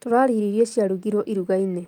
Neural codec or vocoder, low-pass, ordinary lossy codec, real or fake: none; 19.8 kHz; Opus, 32 kbps; real